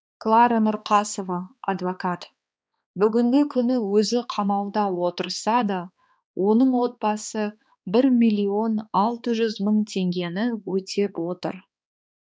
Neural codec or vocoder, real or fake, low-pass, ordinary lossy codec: codec, 16 kHz, 2 kbps, X-Codec, HuBERT features, trained on balanced general audio; fake; none; none